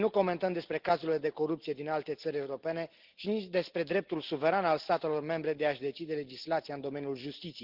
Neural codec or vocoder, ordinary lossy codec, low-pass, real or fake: none; Opus, 16 kbps; 5.4 kHz; real